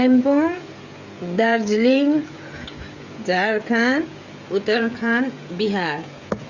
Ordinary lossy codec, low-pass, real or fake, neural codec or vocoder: Opus, 64 kbps; 7.2 kHz; fake; codec, 16 kHz, 16 kbps, FreqCodec, smaller model